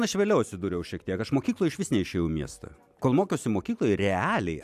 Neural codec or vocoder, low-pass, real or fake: none; 14.4 kHz; real